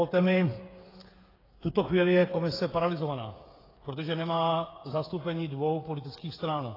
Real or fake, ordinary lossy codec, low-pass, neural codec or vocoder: fake; AAC, 24 kbps; 5.4 kHz; codec, 16 kHz, 8 kbps, FreqCodec, smaller model